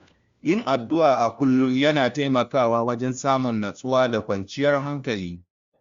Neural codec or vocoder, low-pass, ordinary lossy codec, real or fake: codec, 16 kHz, 1 kbps, FunCodec, trained on LibriTTS, 50 frames a second; 7.2 kHz; Opus, 64 kbps; fake